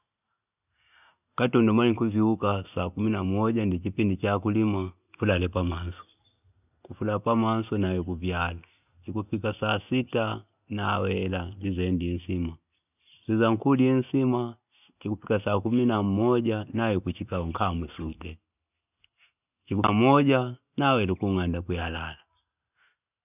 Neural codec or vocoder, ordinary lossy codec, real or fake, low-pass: none; none; real; 3.6 kHz